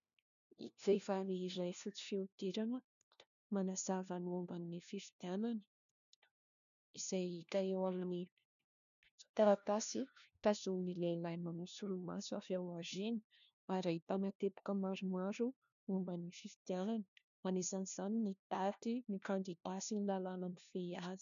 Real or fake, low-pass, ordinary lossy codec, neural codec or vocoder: fake; 7.2 kHz; MP3, 96 kbps; codec, 16 kHz, 1 kbps, FunCodec, trained on LibriTTS, 50 frames a second